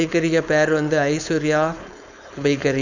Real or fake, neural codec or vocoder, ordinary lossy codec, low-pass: fake; codec, 16 kHz, 4.8 kbps, FACodec; none; 7.2 kHz